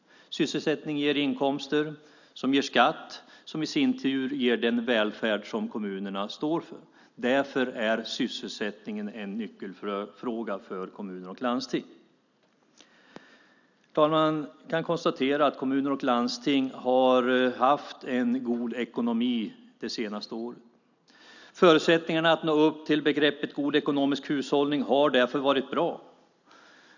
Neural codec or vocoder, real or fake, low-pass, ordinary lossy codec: none; real; 7.2 kHz; none